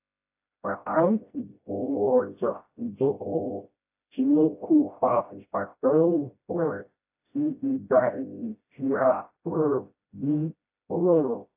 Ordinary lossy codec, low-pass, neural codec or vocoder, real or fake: AAC, 32 kbps; 3.6 kHz; codec, 16 kHz, 0.5 kbps, FreqCodec, smaller model; fake